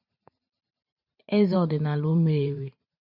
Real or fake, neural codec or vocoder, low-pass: fake; vocoder, 44.1 kHz, 128 mel bands every 512 samples, BigVGAN v2; 5.4 kHz